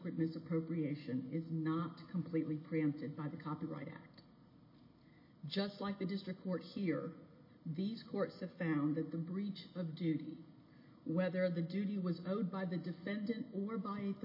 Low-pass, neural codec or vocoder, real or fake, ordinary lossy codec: 5.4 kHz; none; real; MP3, 24 kbps